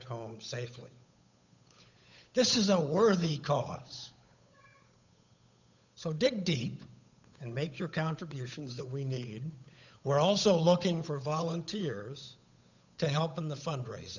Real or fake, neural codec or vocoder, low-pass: fake; codec, 16 kHz, 8 kbps, FunCodec, trained on Chinese and English, 25 frames a second; 7.2 kHz